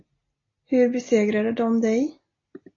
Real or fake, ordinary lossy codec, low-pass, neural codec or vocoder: real; AAC, 32 kbps; 7.2 kHz; none